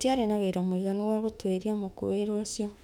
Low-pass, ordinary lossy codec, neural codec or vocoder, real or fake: 19.8 kHz; none; autoencoder, 48 kHz, 32 numbers a frame, DAC-VAE, trained on Japanese speech; fake